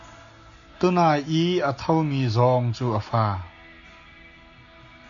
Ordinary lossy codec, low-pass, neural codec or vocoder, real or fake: AAC, 64 kbps; 7.2 kHz; none; real